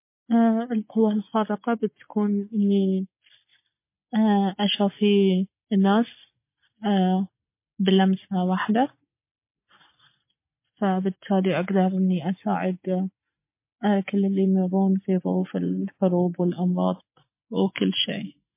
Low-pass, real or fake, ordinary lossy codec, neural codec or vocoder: 3.6 kHz; real; MP3, 24 kbps; none